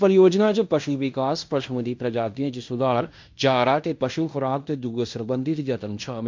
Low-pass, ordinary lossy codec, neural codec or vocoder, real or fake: 7.2 kHz; MP3, 64 kbps; codec, 16 kHz in and 24 kHz out, 0.9 kbps, LongCat-Audio-Codec, fine tuned four codebook decoder; fake